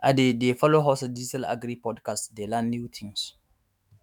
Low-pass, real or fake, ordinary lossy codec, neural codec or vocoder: 19.8 kHz; fake; none; autoencoder, 48 kHz, 128 numbers a frame, DAC-VAE, trained on Japanese speech